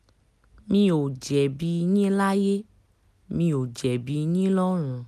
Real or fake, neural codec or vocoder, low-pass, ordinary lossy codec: real; none; 14.4 kHz; none